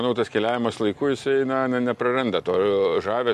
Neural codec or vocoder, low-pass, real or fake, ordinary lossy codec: none; 14.4 kHz; real; MP3, 64 kbps